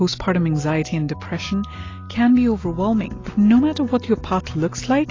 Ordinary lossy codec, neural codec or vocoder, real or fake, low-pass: AAC, 32 kbps; none; real; 7.2 kHz